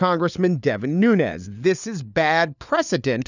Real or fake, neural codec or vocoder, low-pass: fake; codec, 16 kHz, 4 kbps, FunCodec, trained on LibriTTS, 50 frames a second; 7.2 kHz